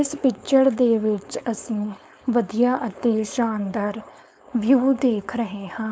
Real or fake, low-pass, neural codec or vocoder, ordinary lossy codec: fake; none; codec, 16 kHz, 4.8 kbps, FACodec; none